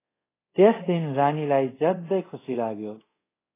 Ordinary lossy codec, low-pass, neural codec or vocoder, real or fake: AAC, 16 kbps; 3.6 kHz; codec, 24 kHz, 0.5 kbps, DualCodec; fake